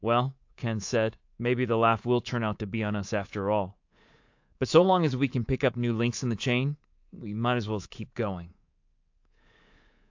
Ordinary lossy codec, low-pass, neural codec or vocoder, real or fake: AAC, 48 kbps; 7.2 kHz; codec, 24 kHz, 3.1 kbps, DualCodec; fake